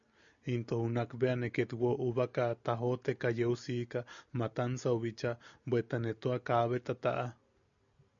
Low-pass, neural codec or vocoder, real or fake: 7.2 kHz; none; real